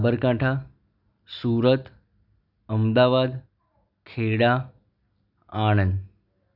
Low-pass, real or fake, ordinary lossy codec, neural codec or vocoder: 5.4 kHz; real; none; none